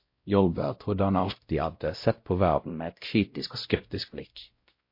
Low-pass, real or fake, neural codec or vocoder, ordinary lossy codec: 5.4 kHz; fake; codec, 16 kHz, 0.5 kbps, X-Codec, WavLM features, trained on Multilingual LibriSpeech; MP3, 32 kbps